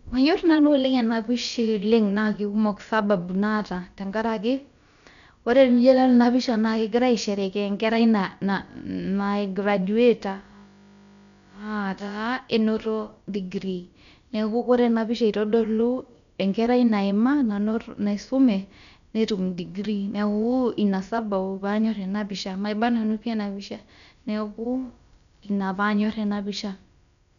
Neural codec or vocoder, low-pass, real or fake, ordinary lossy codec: codec, 16 kHz, about 1 kbps, DyCAST, with the encoder's durations; 7.2 kHz; fake; none